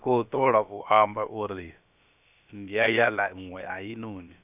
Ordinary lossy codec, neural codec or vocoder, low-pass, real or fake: none; codec, 16 kHz, about 1 kbps, DyCAST, with the encoder's durations; 3.6 kHz; fake